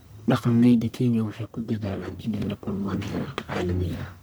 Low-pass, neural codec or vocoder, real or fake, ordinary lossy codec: none; codec, 44.1 kHz, 1.7 kbps, Pupu-Codec; fake; none